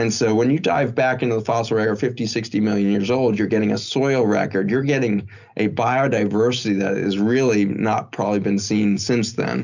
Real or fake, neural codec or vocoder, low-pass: real; none; 7.2 kHz